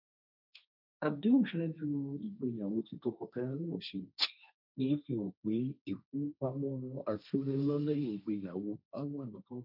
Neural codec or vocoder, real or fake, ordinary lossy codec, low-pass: codec, 16 kHz, 1.1 kbps, Voila-Tokenizer; fake; none; 5.4 kHz